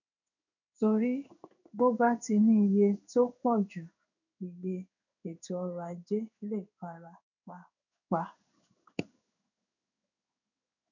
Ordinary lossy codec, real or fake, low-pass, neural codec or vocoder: none; fake; 7.2 kHz; codec, 16 kHz in and 24 kHz out, 1 kbps, XY-Tokenizer